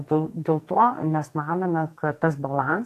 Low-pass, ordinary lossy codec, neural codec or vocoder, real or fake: 14.4 kHz; AAC, 64 kbps; codec, 44.1 kHz, 2.6 kbps, SNAC; fake